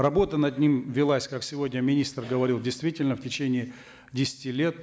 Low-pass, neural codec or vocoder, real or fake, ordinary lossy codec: none; none; real; none